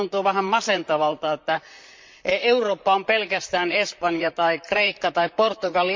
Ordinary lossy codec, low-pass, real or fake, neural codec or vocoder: MP3, 64 kbps; 7.2 kHz; fake; vocoder, 44.1 kHz, 128 mel bands, Pupu-Vocoder